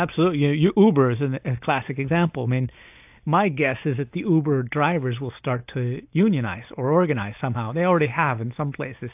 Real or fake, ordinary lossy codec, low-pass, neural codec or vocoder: real; AAC, 32 kbps; 3.6 kHz; none